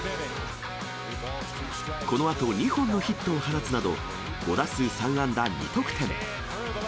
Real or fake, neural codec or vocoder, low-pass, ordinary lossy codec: real; none; none; none